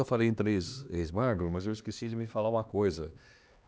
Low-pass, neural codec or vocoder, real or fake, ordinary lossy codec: none; codec, 16 kHz, 2 kbps, X-Codec, HuBERT features, trained on LibriSpeech; fake; none